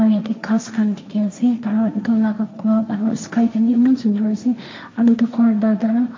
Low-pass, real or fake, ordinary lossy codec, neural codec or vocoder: 7.2 kHz; fake; MP3, 48 kbps; codec, 16 kHz, 1.1 kbps, Voila-Tokenizer